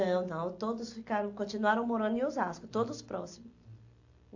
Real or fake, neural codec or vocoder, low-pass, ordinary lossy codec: real; none; 7.2 kHz; AAC, 48 kbps